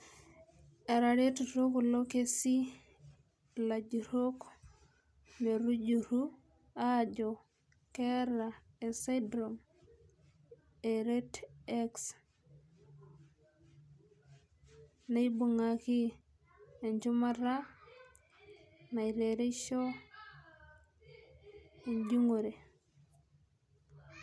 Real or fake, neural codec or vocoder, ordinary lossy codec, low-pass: real; none; none; none